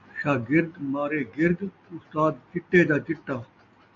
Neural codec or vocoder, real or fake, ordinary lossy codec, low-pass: none; real; AAC, 48 kbps; 7.2 kHz